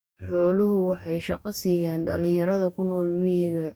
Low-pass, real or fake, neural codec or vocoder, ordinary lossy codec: none; fake; codec, 44.1 kHz, 2.6 kbps, DAC; none